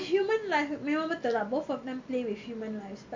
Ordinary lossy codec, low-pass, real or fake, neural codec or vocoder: MP3, 48 kbps; 7.2 kHz; real; none